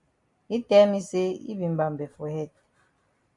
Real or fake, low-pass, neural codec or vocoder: real; 10.8 kHz; none